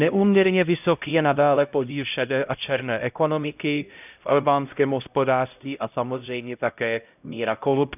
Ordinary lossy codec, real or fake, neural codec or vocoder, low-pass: none; fake; codec, 16 kHz, 0.5 kbps, X-Codec, HuBERT features, trained on LibriSpeech; 3.6 kHz